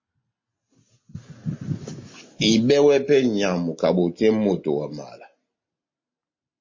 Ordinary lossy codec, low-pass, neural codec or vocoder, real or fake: MP3, 32 kbps; 7.2 kHz; none; real